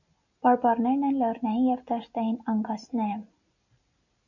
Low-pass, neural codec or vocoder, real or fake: 7.2 kHz; none; real